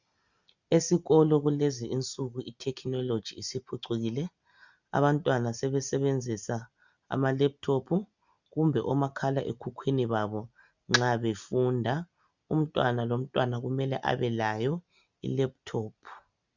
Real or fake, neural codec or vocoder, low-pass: real; none; 7.2 kHz